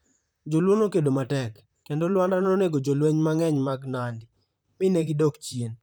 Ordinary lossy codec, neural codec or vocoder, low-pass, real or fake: none; vocoder, 44.1 kHz, 128 mel bands, Pupu-Vocoder; none; fake